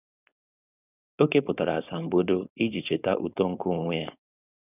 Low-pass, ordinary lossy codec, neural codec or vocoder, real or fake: 3.6 kHz; none; codec, 16 kHz, 4.8 kbps, FACodec; fake